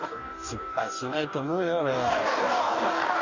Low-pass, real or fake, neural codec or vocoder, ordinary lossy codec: 7.2 kHz; fake; codec, 44.1 kHz, 2.6 kbps, DAC; none